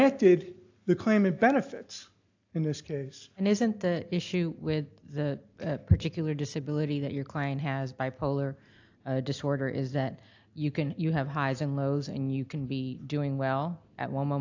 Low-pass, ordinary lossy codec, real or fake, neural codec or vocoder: 7.2 kHz; AAC, 48 kbps; real; none